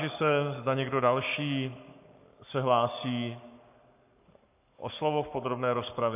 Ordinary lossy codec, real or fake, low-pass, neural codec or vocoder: AAC, 32 kbps; fake; 3.6 kHz; codec, 44.1 kHz, 7.8 kbps, Pupu-Codec